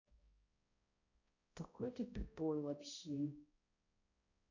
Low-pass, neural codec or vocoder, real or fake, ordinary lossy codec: 7.2 kHz; codec, 16 kHz, 0.5 kbps, X-Codec, HuBERT features, trained on balanced general audio; fake; AAC, 48 kbps